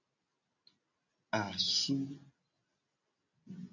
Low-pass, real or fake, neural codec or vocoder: 7.2 kHz; real; none